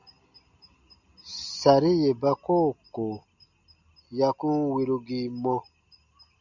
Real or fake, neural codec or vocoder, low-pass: real; none; 7.2 kHz